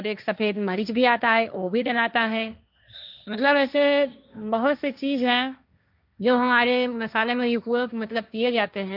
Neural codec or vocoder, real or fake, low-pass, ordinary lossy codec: codec, 16 kHz, 1.1 kbps, Voila-Tokenizer; fake; 5.4 kHz; none